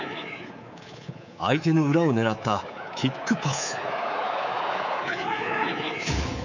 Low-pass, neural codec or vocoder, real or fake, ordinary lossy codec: 7.2 kHz; codec, 24 kHz, 3.1 kbps, DualCodec; fake; none